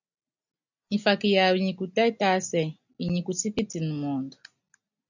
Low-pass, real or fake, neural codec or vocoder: 7.2 kHz; real; none